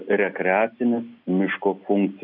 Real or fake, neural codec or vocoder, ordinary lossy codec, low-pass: real; none; AAC, 48 kbps; 5.4 kHz